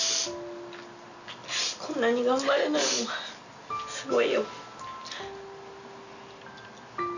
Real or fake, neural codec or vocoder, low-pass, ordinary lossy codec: real; none; 7.2 kHz; none